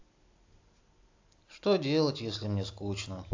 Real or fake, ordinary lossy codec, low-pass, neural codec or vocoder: real; AAC, 48 kbps; 7.2 kHz; none